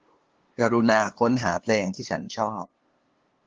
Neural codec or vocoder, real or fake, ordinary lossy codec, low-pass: codec, 16 kHz, 2 kbps, FunCodec, trained on LibriTTS, 25 frames a second; fake; Opus, 16 kbps; 7.2 kHz